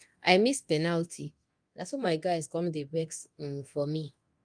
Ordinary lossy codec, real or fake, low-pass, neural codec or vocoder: Opus, 32 kbps; fake; 9.9 kHz; codec, 24 kHz, 0.9 kbps, DualCodec